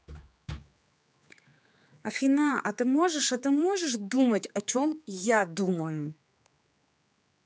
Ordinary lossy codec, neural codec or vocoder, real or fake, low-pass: none; codec, 16 kHz, 4 kbps, X-Codec, HuBERT features, trained on general audio; fake; none